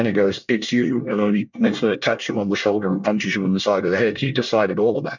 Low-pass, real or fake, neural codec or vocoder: 7.2 kHz; fake; codec, 24 kHz, 1 kbps, SNAC